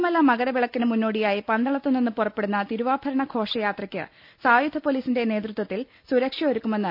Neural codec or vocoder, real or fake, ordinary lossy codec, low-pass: none; real; none; 5.4 kHz